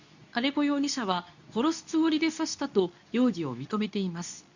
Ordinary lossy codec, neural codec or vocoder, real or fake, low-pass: none; codec, 24 kHz, 0.9 kbps, WavTokenizer, medium speech release version 2; fake; 7.2 kHz